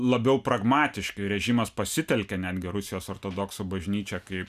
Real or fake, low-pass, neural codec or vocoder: real; 14.4 kHz; none